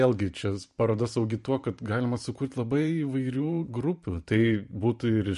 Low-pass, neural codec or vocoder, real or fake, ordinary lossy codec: 14.4 kHz; vocoder, 44.1 kHz, 128 mel bands every 512 samples, BigVGAN v2; fake; MP3, 48 kbps